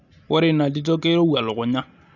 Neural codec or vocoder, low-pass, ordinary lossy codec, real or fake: none; 7.2 kHz; none; real